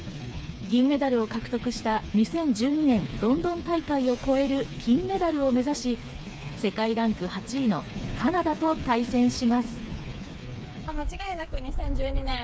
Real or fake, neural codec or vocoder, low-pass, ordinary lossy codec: fake; codec, 16 kHz, 4 kbps, FreqCodec, smaller model; none; none